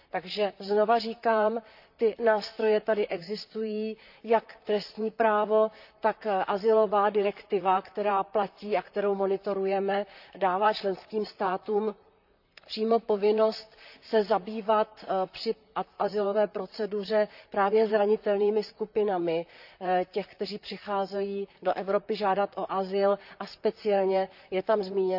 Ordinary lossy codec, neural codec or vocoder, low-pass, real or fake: none; vocoder, 44.1 kHz, 128 mel bands, Pupu-Vocoder; 5.4 kHz; fake